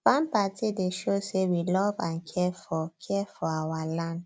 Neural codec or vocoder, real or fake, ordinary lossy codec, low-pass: none; real; none; none